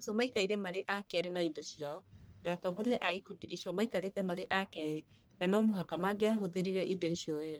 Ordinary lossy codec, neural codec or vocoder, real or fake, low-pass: none; codec, 44.1 kHz, 1.7 kbps, Pupu-Codec; fake; none